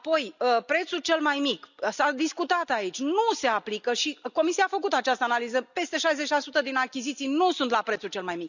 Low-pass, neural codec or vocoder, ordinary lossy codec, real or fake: 7.2 kHz; none; none; real